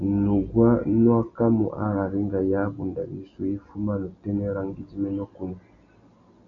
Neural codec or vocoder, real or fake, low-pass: none; real; 7.2 kHz